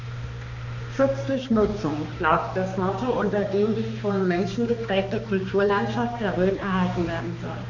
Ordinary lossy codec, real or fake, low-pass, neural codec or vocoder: none; fake; 7.2 kHz; codec, 16 kHz, 2 kbps, X-Codec, HuBERT features, trained on balanced general audio